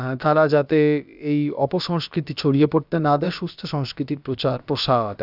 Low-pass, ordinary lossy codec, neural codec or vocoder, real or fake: 5.4 kHz; none; codec, 16 kHz, about 1 kbps, DyCAST, with the encoder's durations; fake